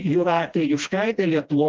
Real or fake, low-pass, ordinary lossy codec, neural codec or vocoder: fake; 7.2 kHz; Opus, 24 kbps; codec, 16 kHz, 1 kbps, FreqCodec, smaller model